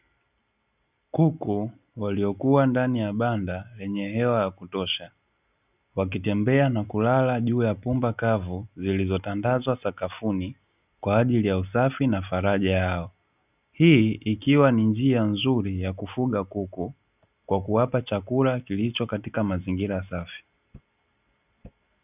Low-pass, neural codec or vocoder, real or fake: 3.6 kHz; none; real